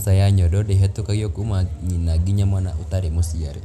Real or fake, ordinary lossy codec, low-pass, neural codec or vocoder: real; none; 14.4 kHz; none